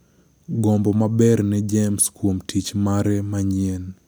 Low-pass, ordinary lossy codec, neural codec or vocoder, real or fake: none; none; none; real